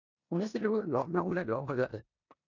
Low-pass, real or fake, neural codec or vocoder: 7.2 kHz; fake; codec, 16 kHz in and 24 kHz out, 0.9 kbps, LongCat-Audio-Codec, four codebook decoder